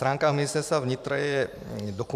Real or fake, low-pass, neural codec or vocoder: fake; 14.4 kHz; vocoder, 44.1 kHz, 128 mel bands every 512 samples, BigVGAN v2